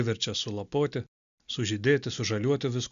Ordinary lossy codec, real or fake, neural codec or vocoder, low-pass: MP3, 96 kbps; real; none; 7.2 kHz